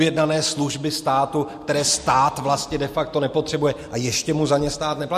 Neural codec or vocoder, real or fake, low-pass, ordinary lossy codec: none; real; 14.4 kHz; MP3, 64 kbps